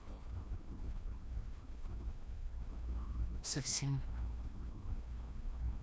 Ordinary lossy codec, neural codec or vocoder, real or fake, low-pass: none; codec, 16 kHz, 1 kbps, FreqCodec, larger model; fake; none